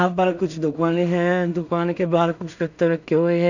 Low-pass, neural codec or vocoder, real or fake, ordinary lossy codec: 7.2 kHz; codec, 16 kHz in and 24 kHz out, 0.4 kbps, LongCat-Audio-Codec, two codebook decoder; fake; none